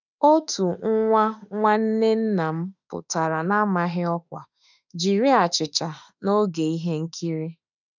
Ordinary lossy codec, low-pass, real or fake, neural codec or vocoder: none; 7.2 kHz; fake; autoencoder, 48 kHz, 32 numbers a frame, DAC-VAE, trained on Japanese speech